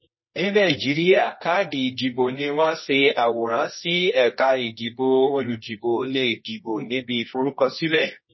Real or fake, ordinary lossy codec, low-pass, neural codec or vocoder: fake; MP3, 24 kbps; 7.2 kHz; codec, 24 kHz, 0.9 kbps, WavTokenizer, medium music audio release